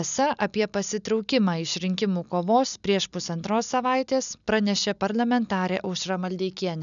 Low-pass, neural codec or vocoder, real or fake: 7.2 kHz; none; real